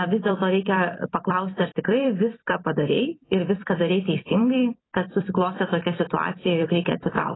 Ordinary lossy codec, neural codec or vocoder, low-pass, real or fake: AAC, 16 kbps; vocoder, 44.1 kHz, 128 mel bands every 256 samples, BigVGAN v2; 7.2 kHz; fake